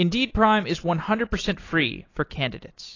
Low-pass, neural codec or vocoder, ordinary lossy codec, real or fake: 7.2 kHz; none; AAC, 32 kbps; real